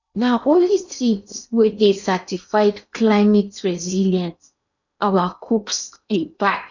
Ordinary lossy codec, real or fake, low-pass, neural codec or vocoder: none; fake; 7.2 kHz; codec, 16 kHz in and 24 kHz out, 0.8 kbps, FocalCodec, streaming, 65536 codes